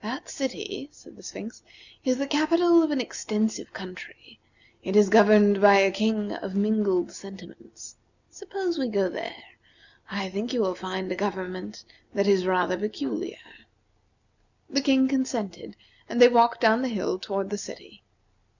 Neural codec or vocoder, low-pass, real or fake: none; 7.2 kHz; real